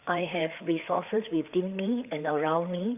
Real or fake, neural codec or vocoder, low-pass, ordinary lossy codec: fake; codec, 16 kHz, 4 kbps, FreqCodec, larger model; 3.6 kHz; none